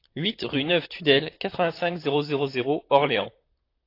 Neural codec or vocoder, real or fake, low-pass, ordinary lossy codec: vocoder, 44.1 kHz, 128 mel bands, Pupu-Vocoder; fake; 5.4 kHz; AAC, 32 kbps